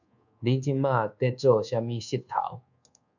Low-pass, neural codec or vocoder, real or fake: 7.2 kHz; codec, 24 kHz, 1.2 kbps, DualCodec; fake